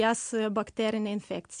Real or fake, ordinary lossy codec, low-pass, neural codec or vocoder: real; MP3, 64 kbps; 9.9 kHz; none